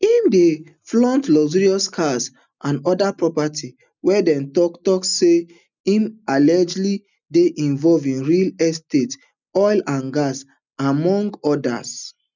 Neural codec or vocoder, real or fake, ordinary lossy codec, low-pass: none; real; none; 7.2 kHz